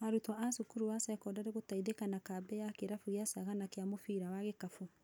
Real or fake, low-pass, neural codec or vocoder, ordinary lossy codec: real; none; none; none